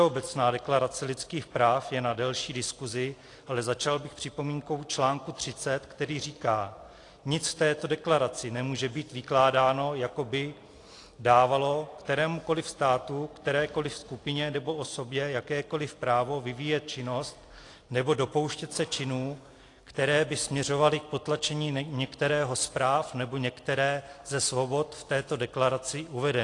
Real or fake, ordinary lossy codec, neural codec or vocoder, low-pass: real; AAC, 48 kbps; none; 10.8 kHz